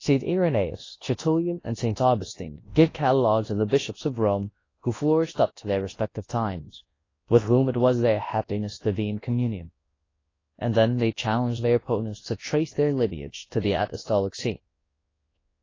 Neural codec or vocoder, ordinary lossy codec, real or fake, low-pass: codec, 24 kHz, 0.9 kbps, WavTokenizer, large speech release; AAC, 32 kbps; fake; 7.2 kHz